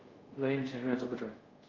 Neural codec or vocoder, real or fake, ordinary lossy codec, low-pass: codec, 24 kHz, 0.5 kbps, DualCodec; fake; Opus, 24 kbps; 7.2 kHz